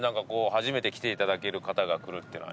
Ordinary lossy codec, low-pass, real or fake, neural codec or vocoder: none; none; real; none